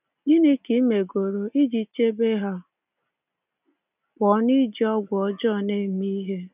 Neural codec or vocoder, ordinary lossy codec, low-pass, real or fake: none; none; 3.6 kHz; real